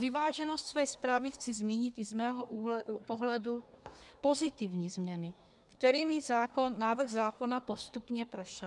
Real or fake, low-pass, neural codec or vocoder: fake; 10.8 kHz; codec, 24 kHz, 1 kbps, SNAC